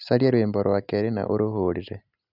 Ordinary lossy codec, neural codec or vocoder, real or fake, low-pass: none; none; real; 5.4 kHz